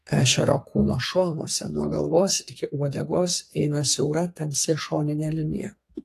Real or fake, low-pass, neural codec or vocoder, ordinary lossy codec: fake; 14.4 kHz; codec, 32 kHz, 1.9 kbps, SNAC; AAC, 48 kbps